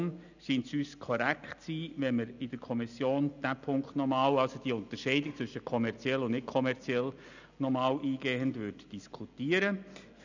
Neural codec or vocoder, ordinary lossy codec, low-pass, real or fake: none; none; 7.2 kHz; real